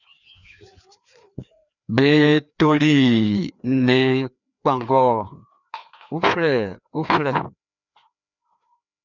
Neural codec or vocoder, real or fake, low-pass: codec, 16 kHz, 2 kbps, FreqCodec, larger model; fake; 7.2 kHz